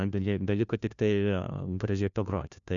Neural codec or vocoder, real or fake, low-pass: codec, 16 kHz, 1 kbps, FunCodec, trained on LibriTTS, 50 frames a second; fake; 7.2 kHz